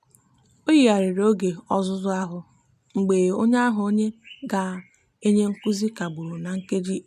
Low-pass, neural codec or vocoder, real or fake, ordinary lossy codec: 14.4 kHz; none; real; none